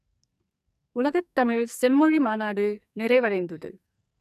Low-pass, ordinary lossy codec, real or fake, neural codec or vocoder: 14.4 kHz; none; fake; codec, 44.1 kHz, 2.6 kbps, SNAC